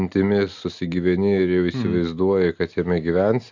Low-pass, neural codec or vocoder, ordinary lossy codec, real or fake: 7.2 kHz; none; MP3, 64 kbps; real